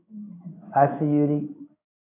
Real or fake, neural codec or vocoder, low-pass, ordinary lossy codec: fake; codec, 16 kHz in and 24 kHz out, 1 kbps, XY-Tokenizer; 3.6 kHz; AAC, 24 kbps